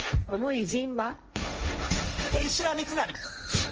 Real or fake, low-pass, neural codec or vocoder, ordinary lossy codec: fake; 7.2 kHz; codec, 16 kHz, 1.1 kbps, Voila-Tokenizer; Opus, 24 kbps